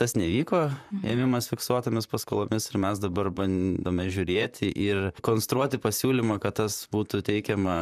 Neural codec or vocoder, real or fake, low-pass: vocoder, 44.1 kHz, 128 mel bands, Pupu-Vocoder; fake; 14.4 kHz